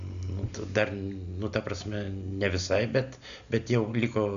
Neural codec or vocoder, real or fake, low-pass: none; real; 7.2 kHz